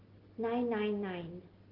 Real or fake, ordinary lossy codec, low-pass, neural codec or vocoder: real; Opus, 16 kbps; 5.4 kHz; none